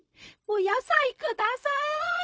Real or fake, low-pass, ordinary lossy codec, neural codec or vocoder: fake; none; none; codec, 16 kHz, 0.4 kbps, LongCat-Audio-Codec